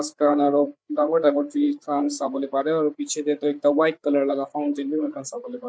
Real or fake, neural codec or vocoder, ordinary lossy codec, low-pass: fake; codec, 16 kHz, 8 kbps, FreqCodec, larger model; none; none